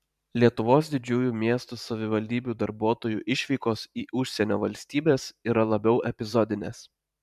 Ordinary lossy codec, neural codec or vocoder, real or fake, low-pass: MP3, 96 kbps; vocoder, 44.1 kHz, 128 mel bands every 512 samples, BigVGAN v2; fake; 14.4 kHz